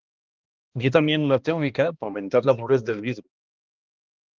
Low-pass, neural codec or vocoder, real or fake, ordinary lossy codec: 7.2 kHz; codec, 16 kHz, 1 kbps, X-Codec, HuBERT features, trained on balanced general audio; fake; Opus, 32 kbps